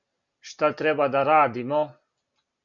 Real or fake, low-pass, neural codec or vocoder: real; 7.2 kHz; none